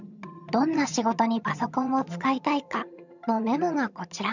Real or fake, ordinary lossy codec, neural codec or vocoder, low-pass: fake; none; vocoder, 22.05 kHz, 80 mel bands, HiFi-GAN; 7.2 kHz